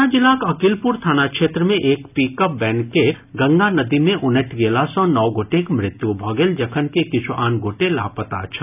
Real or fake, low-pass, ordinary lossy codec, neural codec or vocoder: real; 3.6 kHz; none; none